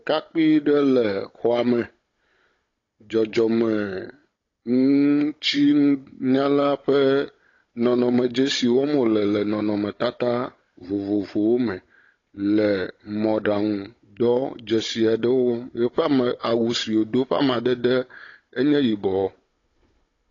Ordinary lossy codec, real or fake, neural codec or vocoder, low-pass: AAC, 32 kbps; fake; codec, 16 kHz, 16 kbps, FunCodec, trained on Chinese and English, 50 frames a second; 7.2 kHz